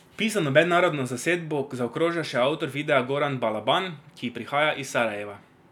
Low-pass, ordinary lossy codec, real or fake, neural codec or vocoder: 19.8 kHz; none; real; none